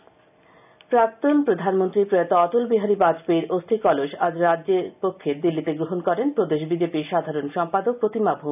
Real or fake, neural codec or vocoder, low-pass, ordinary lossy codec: real; none; 3.6 kHz; none